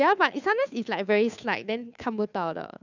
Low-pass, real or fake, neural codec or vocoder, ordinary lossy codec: 7.2 kHz; fake; codec, 16 kHz, 2 kbps, FunCodec, trained on Chinese and English, 25 frames a second; none